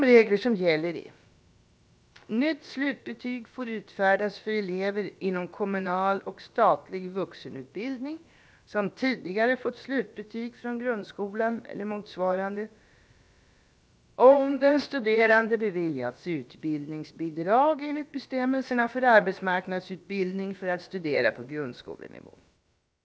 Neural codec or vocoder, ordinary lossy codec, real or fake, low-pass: codec, 16 kHz, about 1 kbps, DyCAST, with the encoder's durations; none; fake; none